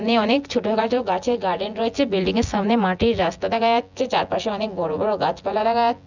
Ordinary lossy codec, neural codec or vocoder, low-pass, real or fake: none; vocoder, 24 kHz, 100 mel bands, Vocos; 7.2 kHz; fake